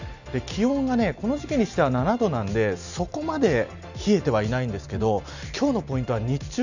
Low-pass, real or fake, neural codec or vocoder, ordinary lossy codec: 7.2 kHz; real; none; none